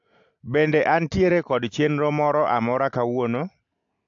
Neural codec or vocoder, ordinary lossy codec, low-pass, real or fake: none; AAC, 64 kbps; 7.2 kHz; real